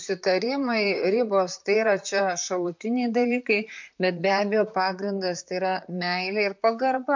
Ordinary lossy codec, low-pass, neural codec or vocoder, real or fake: MP3, 48 kbps; 7.2 kHz; vocoder, 44.1 kHz, 128 mel bands, Pupu-Vocoder; fake